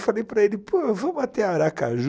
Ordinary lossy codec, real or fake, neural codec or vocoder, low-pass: none; real; none; none